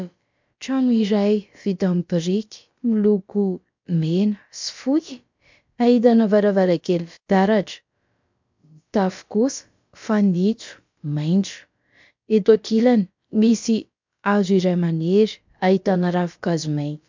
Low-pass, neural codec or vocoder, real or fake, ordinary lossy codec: 7.2 kHz; codec, 16 kHz, about 1 kbps, DyCAST, with the encoder's durations; fake; MP3, 64 kbps